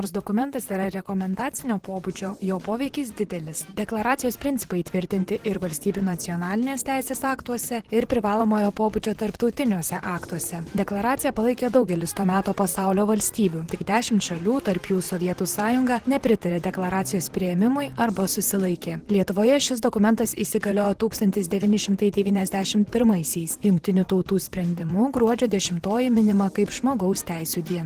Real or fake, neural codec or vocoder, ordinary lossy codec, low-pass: fake; vocoder, 44.1 kHz, 128 mel bands, Pupu-Vocoder; Opus, 16 kbps; 14.4 kHz